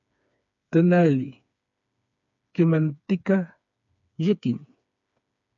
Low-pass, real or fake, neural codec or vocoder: 7.2 kHz; fake; codec, 16 kHz, 4 kbps, FreqCodec, smaller model